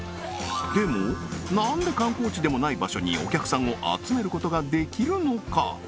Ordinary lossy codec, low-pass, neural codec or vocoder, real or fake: none; none; none; real